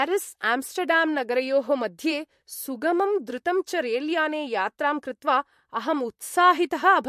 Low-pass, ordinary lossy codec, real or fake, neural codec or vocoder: 14.4 kHz; MP3, 64 kbps; fake; vocoder, 44.1 kHz, 128 mel bands, Pupu-Vocoder